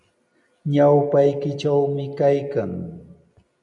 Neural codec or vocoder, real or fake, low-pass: none; real; 10.8 kHz